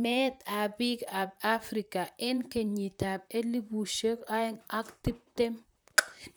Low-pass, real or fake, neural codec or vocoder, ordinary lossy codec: none; fake; vocoder, 44.1 kHz, 128 mel bands, Pupu-Vocoder; none